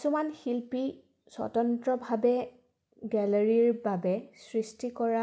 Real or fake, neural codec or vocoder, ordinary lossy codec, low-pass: real; none; none; none